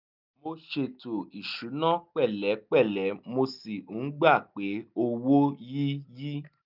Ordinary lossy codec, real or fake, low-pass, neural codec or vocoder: none; real; 5.4 kHz; none